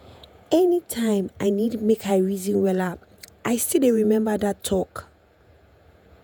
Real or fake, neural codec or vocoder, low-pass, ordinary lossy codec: fake; vocoder, 48 kHz, 128 mel bands, Vocos; none; none